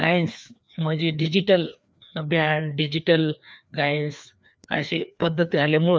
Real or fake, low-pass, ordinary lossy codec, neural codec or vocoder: fake; none; none; codec, 16 kHz, 2 kbps, FreqCodec, larger model